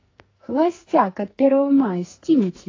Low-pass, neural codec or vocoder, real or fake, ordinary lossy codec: 7.2 kHz; codec, 44.1 kHz, 2.6 kbps, SNAC; fake; AAC, 32 kbps